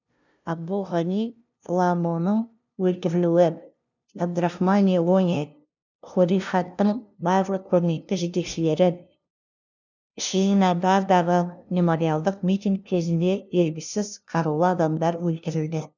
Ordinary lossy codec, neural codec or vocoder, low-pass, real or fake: none; codec, 16 kHz, 0.5 kbps, FunCodec, trained on LibriTTS, 25 frames a second; 7.2 kHz; fake